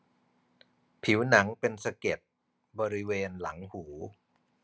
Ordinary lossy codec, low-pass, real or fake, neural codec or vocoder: none; none; real; none